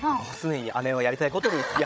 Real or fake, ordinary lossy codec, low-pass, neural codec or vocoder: fake; none; none; codec, 16 kHz, 16 kbps, FreqCodec, larger model